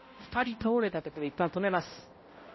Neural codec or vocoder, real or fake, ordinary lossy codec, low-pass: codec, 16 kHz, 0.5 kbps, X-Codec, HuBERT features, trained on balanced general audio; fake; MP3, 24 kbps; 7.2 kHz